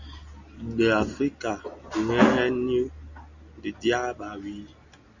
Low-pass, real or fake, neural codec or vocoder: 7.2 kHz; real; none